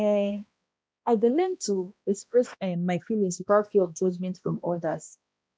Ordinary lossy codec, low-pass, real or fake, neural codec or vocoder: none; none; fake; codec, 16 kHz, 1 kbps, X-Codec, HuBERT features, trained on balanced general audio